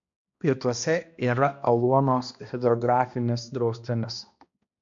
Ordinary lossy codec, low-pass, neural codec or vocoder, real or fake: AAC, 64 kbps; 7.2 kHz; codec, 16 kHz, 1 kbps, X-Codec, HuBERT features, trained on balanced general audio; fake